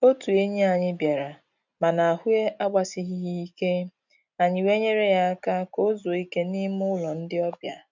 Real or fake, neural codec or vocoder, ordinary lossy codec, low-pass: real; none; none; 7.2 kHz